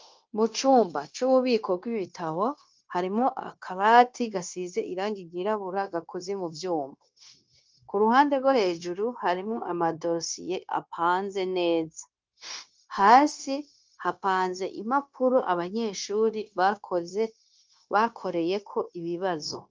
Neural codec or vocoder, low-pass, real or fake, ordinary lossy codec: codec, 16 kHz, 0.9 kbps, LongCat-Audio-Codec; 7.2 kHz; fake; Opus, 24 kbps